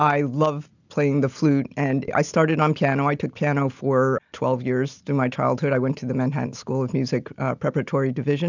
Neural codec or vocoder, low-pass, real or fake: none; 7.2 kHz; real